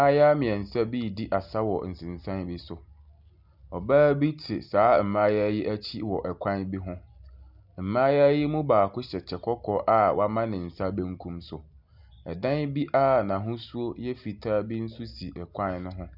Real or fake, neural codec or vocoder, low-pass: real; none; 5.4 kHz